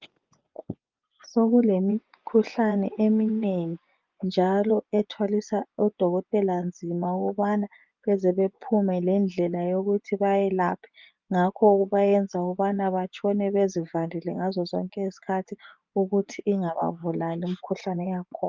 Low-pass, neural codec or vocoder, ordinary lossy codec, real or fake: 7.2 kHz; vocoder, 24 kHz, 100 mel bands, Vocos; Opus, 24 kbps; fake